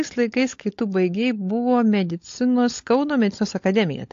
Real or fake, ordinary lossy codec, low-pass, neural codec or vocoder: fake; AAC, 48 kbps; 7.2 kHz; codec, 16 kHz, 16 kbps, FunCodec, trained on LibriTTS, 50 frames a second